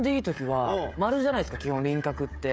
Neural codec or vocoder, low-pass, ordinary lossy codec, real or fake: codec, 16 kHz, 16 kbps, FreqCodec, smaller model; none; none; fake